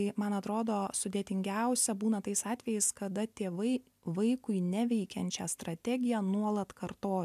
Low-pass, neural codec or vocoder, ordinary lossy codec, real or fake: 14.4 kHz; none; MP3, 96 kbps; real